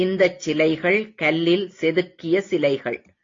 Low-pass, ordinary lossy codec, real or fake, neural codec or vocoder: 7.2 kHz; AAC, 32 kbps; real; none